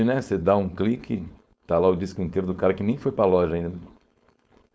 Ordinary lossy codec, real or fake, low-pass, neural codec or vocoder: none; fake; none; codec, 16 kHz, 4.8 kbps, FACodec